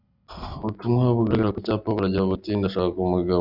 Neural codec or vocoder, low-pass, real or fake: none; 5.4 kHz; real